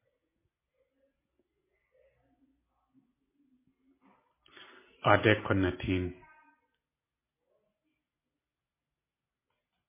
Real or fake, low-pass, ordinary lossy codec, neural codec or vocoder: real; 3.6 kHz; MP3, 16 kbps; none